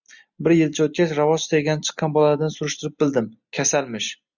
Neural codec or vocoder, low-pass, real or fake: none; 7.2 kHz; real